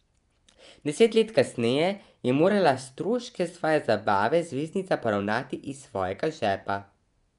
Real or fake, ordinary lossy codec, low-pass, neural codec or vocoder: fake; none; 10.8 kHz; vocoder, 24 kHz, 100 mel bands, Vocos